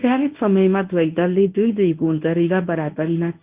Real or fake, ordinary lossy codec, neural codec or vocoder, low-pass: fake; Opus, 32 kbps; codec, 24 kHz, 0.9 kbps, WavTokenizer, medium speech release version 1; 3.6 kHz